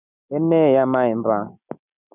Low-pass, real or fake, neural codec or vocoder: 3.6 kHz; real; none